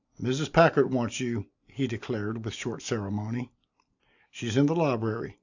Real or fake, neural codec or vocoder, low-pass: real; none; 7.2 kHz